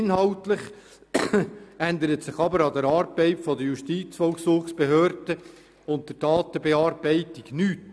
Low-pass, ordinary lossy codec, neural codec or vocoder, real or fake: none; none; none; real